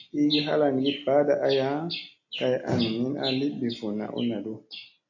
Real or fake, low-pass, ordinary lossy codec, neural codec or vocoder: real; 7.2 kHz; AAC, 48 kbps; none